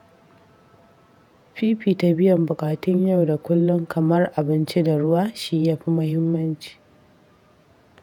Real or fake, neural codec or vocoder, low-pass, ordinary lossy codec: fake; vocoder, 48 kHz, 128 mel bands, Vocos; 19.8 kHz; none